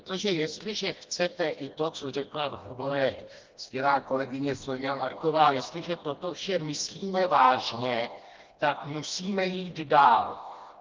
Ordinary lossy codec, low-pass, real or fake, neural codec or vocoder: Opus, 24 kbps; 7.2 kHz; fake; codec, 16 kHz, 1 kbps, FreqCodec, smaller model